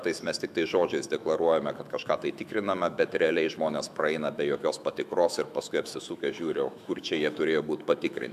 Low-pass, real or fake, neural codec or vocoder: 14.4 kHz; fake; autoencoder, 48 kHz, 128 numbers a frame, DAC-VAE, trained on Japanese speech